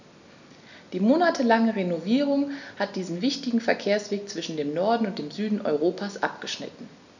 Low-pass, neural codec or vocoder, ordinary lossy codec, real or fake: 7.2 kHz; none; none; real